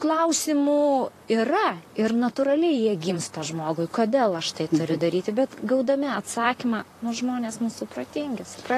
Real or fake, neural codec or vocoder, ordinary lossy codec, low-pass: fake; vocoder, 44.1 kHz, 128 mel bands, Pupu-Vocoder; AAC, 48 kbps; 14.4 kHz